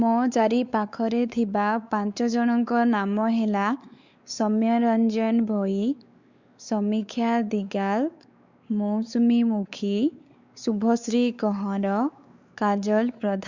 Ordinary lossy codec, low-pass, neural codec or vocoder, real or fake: Opus, 64 kbps; 7.2 kHz; codec, 16 kHz, 4 kbps, X-Codec, WavLM features, trained on Multilingual LibriSpeech; fake